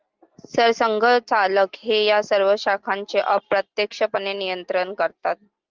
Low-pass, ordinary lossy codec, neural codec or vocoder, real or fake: 7.2 kHz; Opus, 32 kbps; none; real